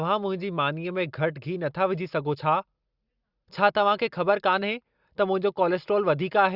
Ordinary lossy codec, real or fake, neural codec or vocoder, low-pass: Opus, 64 kbps; real; none; 5.4 kHz